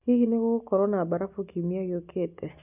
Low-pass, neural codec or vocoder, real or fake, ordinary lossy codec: 3.6 kHz; none; real; none